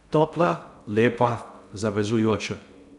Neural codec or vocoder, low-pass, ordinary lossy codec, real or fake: codec, 16 kHz in and 24 kHz out, 0.6 kbps, FocalCodec, streaming, 2048 codes; 10.8 kHz; none; fake